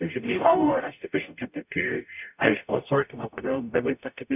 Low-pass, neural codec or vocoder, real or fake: 3.6 kHz; codec, 44.1 kHz, 0.9 kbps, DAC; fake